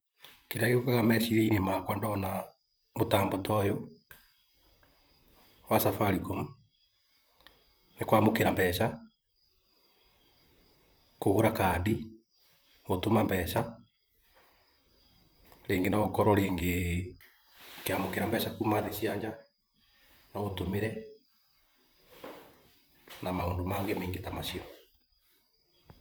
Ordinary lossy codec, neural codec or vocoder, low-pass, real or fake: none; vocoder, 44.1 kHz, 128 mel bands, Pupu-Vocoder; none; fake